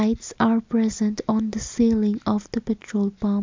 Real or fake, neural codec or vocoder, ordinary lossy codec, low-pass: real; none; MP3, 64 kbps; 7.2 kHz